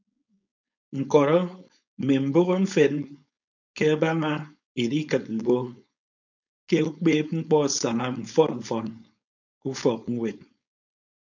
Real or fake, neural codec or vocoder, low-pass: fake; codec, 16 kHz, 4.8 kbps, FACodec; 7.2 kHz